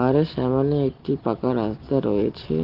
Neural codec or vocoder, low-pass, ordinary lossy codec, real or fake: none; 5.4 kHz; Opus, 16 kbps; real